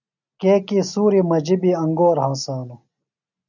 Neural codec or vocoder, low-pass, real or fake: none; 7.2 kHz; real